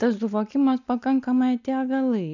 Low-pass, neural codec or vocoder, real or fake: 7.2 kHz; none; real